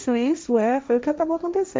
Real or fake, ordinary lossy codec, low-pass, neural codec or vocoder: fake; none; none; codec, 16 kHz, 1.1 kbps, Voila-Tokenizer